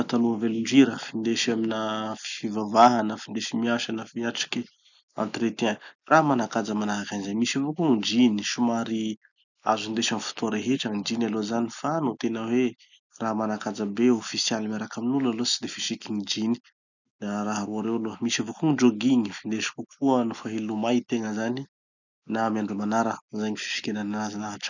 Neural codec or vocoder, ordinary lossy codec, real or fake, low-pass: none; none; real; 7.2 kHz